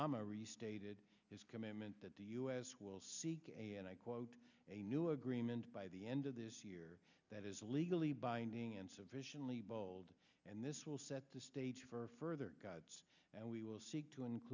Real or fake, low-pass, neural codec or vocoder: real; 7.2 kHz; none